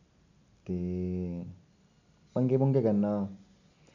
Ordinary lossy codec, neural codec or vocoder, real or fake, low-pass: none; none; real; 7.2 kHz